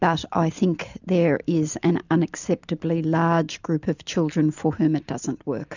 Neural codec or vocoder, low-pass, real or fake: none; 7.2 kHz; real